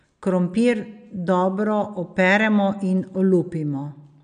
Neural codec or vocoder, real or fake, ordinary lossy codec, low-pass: none; real; none; 9.9 kHz